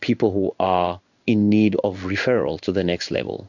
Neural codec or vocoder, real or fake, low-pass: codec, 16 kHz in and 24 kHz out, 1 kbps, XY-Tokenizer; fake; 7.2 kHz